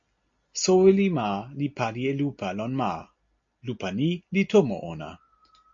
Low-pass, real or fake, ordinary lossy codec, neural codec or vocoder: 7.2 kHz; real; MP3, 48 kbps; none